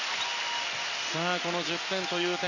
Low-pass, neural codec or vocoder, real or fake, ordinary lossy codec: 7.2 kHz; none; real; none